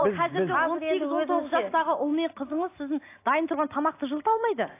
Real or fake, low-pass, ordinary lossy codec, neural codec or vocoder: real; 3.6 kHz; AAC, 24 kbps; none